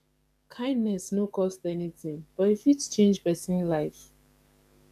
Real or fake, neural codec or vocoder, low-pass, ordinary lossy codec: fake; codec, 44.1 kHz, 7.8 kbps, DAC; 14.4 kHz; none